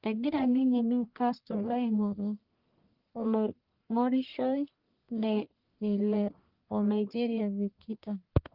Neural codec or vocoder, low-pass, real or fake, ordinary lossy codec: codec, 44.1 kHz, 1.7 kbps, Pupu-Codec; 5.4 kHz; fake; Opus, 24 kbps